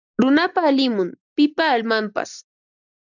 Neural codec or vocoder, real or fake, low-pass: none; real; 7.2 kHz